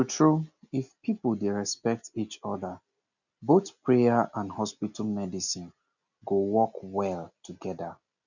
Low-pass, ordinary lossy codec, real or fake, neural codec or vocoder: 7.2 kHz; none; real; none